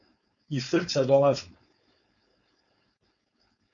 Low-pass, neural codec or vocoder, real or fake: 7.2 kHz; codec, 16 kHz, 4.8 kbps, FACodec; fake